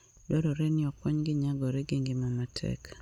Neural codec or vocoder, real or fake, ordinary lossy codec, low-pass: none; real; none; 19.8 kHz